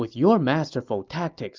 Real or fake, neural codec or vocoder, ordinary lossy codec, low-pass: real; none; Opus, 24 kbps; 7.2 kHz